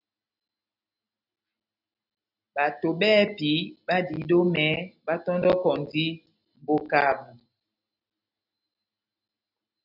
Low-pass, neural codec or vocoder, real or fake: 5.4 kHz; none; real